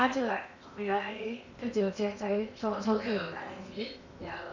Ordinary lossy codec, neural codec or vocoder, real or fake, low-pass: none; codec, 16 kHz in and 24 kHz out, 0.8 kbps, FocalCodec, streaming, 65536 codes; fake; 7.2 kHz